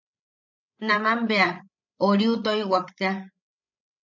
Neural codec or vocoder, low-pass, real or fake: codec, 16 kHz, 16 kbps, FreqCodec, larger model; 7.2 kHz; fake